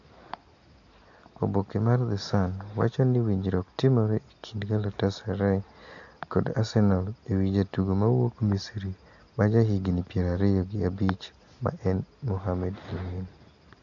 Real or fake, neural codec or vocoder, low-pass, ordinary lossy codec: real; none; 7.2 kHz; AAC, 48 kbps